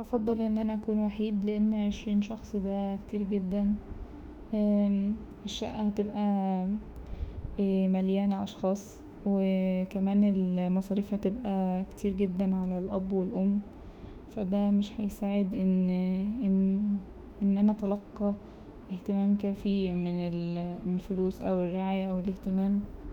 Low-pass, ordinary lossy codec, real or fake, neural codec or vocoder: 19.8 kHz; none; fake; autoencoder, 48 kHz, 32 numbers a frame, DAC-VAE, trained on Japanese speech